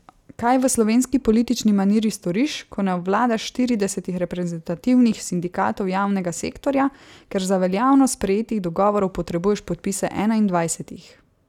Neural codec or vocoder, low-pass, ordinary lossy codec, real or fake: none; 19.8 kHz; none; real